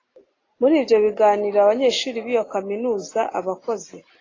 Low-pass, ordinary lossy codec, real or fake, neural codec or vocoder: 7.2 kHz; AAC, 32 kbps; real; none